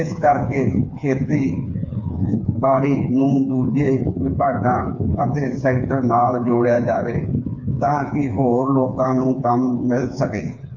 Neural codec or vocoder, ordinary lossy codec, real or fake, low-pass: codec, 16 kHz, 4 kbps, FreqCodec, smaller model; none; fake; 7.2 kHz